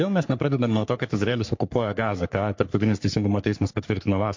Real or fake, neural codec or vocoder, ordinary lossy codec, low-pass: fake; codec, 44.1 kHz, 3.4 kbps, Pupu-Codec; MP3, 48 kbps; 7.2 kHz